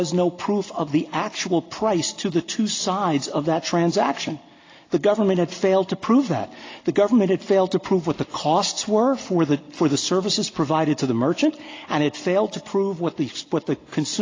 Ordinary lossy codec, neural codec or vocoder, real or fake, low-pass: AAC, 32 kbps; none; real; 7.2 kHz